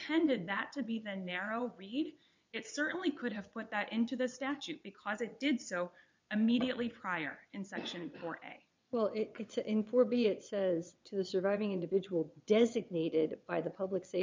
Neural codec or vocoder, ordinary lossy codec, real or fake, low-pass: vocoder, 22.05 kHz, 80 mel bands, WaveNeXt; MP3, 64 kbps; fake; 7.2 kHz